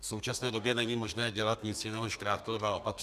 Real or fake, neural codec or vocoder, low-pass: fake; codec, 32 kHz, 1.9 kbps, SNAC; 14.4 kHz